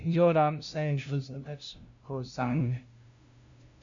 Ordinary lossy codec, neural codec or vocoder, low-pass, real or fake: MP3, 64 kbps; codec, 16 kHz, 0.5 kbps, FunCodec, trained on LibriTTS, 25 frames a second; 7.2 kHz; fake